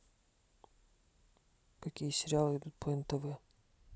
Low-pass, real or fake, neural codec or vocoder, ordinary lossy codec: none; real; none; none